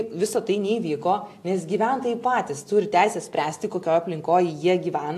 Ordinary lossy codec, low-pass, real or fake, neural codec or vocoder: AAC, 64 kbps; 14.4 kHz; real; none